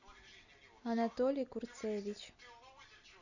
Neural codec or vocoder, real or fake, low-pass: none; real; 7.2 kHz